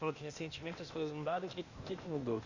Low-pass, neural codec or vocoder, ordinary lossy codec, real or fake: 7.2 kHz; codec, 16 kHz, 0.8 kbps, ZipCodec; Opus, 64 kbps; fake